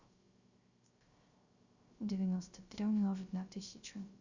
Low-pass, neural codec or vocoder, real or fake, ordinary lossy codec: 7.2 kHz; codec, 16 kHz, 0.3 kbps, FocalCodec; fake; Opus, 64 kbps